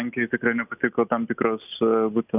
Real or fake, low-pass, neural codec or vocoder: real; 3.6 kHz; none